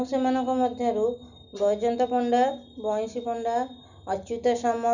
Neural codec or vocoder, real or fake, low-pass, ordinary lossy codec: none; real; 7.2 kHz; none